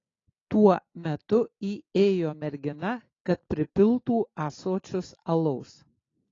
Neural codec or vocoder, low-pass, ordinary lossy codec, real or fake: none; 7.2 kHz; AAC, 32 kbps; real